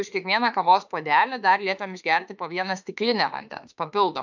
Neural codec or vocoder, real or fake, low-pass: autoencoder, 48 kHz, 32 numbers a frame, DAC-VAE, trained on Japanese speech; fake; 7.2 kHz